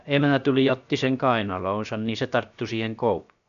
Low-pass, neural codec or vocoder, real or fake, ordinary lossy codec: 7.2 kHz; codec, 16 kHz, about 1 kbps, DyCAST, with the encoder's durations; fake; none